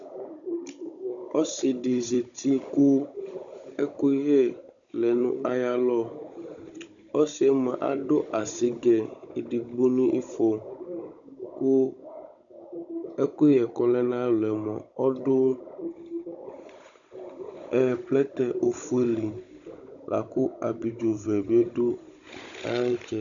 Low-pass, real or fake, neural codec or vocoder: 7.2 kHz; fake; codec, 16 kHz, 16 kbps, FunCodec, trained on Chinese and English, 50 frames a second